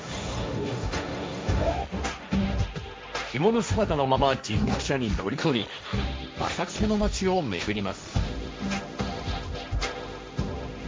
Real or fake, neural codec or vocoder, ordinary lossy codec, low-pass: fake; codec, 16 kHz, 1.1 kbps, Voila-Tokenizer; none; none